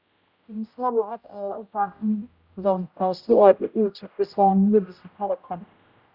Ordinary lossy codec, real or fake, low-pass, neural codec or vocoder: Opus, 64 kbps; fake; 5.4 kHz; codec, 16 kHz, 0.5 kbps, X-Codec, HuBERT features, trained on general audio